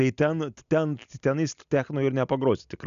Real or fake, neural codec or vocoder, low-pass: real; none; 7.2 kHz